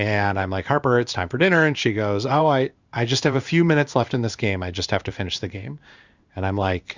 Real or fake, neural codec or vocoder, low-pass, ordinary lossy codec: fake; codec, 16 kHz in and 24 kHz out, 1 kbps, XY-Tokenizer; 7.2 kHz; Opus, 64 kbps